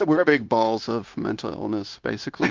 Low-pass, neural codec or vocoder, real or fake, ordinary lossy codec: 7.2 kHz; codec, 16 kHz, 0.9 kbps, LongCat-Audio-Codec; fake; Opus, 32 kbps